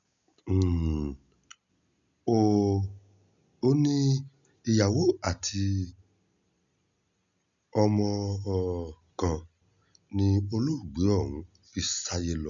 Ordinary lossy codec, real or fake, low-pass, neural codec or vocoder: none; real; 7.2 kHz; none